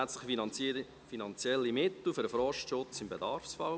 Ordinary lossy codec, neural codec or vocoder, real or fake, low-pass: none; none; real; none